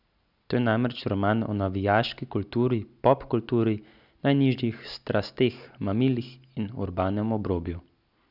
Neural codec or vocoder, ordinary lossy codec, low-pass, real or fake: none; none; 5.4 kHz; real